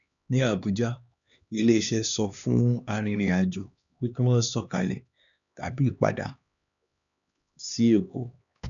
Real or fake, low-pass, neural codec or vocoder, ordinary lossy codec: fake; 7.2 kHz; codec, 16 kHz, 2 kbps, X-Codec, HuBERT features, trained on LibriSpeech; none